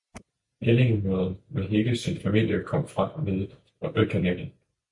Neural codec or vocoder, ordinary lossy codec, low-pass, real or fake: none; MP3, 64 kbps; 10.8 kHz; real